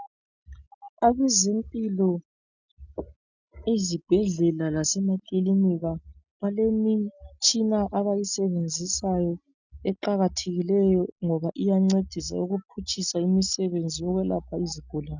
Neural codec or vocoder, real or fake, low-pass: none; real; 7.2 kHz